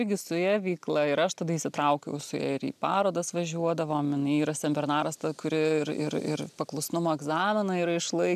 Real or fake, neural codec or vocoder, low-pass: real; none; 14.4 kHz